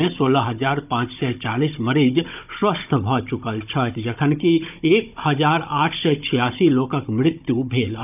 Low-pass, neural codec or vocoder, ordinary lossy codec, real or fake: 3.6 kHz; codec, 16 kHz, 16 kbps, FunCodec, trained on Chinese and English, 50 frames a second; none; fake